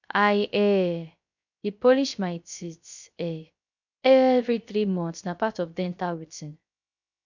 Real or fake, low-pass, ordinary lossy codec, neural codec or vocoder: fake; 7.2 kHz; none; codec, 16 kHz, 0.3 kbps, FocalCodec